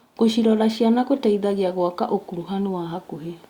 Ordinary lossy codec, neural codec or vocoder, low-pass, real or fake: Opus, 64 kbps; none; 19.8 kHz; real